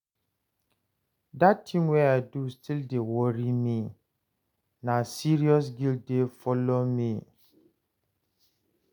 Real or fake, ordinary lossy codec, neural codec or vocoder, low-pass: real; none; none; 19.8 kHz